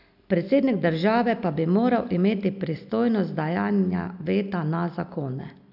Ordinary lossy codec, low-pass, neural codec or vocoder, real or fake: none; 5.4 kHz; none; real